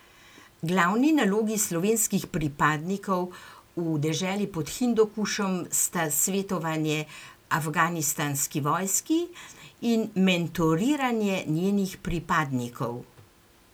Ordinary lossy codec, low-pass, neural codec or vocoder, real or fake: none; none; none; real